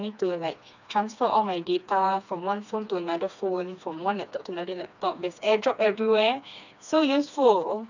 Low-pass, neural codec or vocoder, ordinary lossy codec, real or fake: 7.2 kHz; codec, 16 kHz, 2 kbps, FreqCodec, smaller model; none; fake